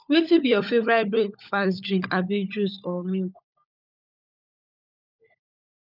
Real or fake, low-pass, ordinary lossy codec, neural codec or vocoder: fake; 5.4 kHz; none; codec, 16 kHz, 16 kbps, FunCodec, trained on LibriTTS, 50 frames a second